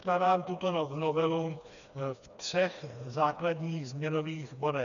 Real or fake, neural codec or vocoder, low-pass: fake; codec, 16 kHz, 2 kbps, FreqCodec, smaller model; 7.2 kHz